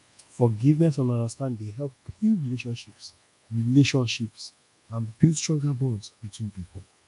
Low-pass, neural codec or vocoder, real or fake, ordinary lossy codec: 10.8 kHz; codec, 24 kHz, 1.2 kbps, DualCodec; fake; none